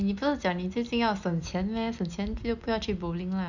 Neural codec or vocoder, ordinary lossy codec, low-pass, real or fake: none; none; 7.2 kHz; real